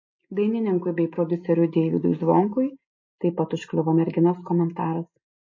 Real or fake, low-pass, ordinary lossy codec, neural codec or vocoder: real; 7.2 kHz; MP3, 32 kbps; none